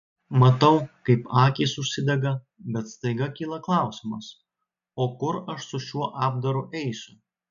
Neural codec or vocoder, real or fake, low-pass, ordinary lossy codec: none; real; 7.2 kHz; AAC, 96 kbps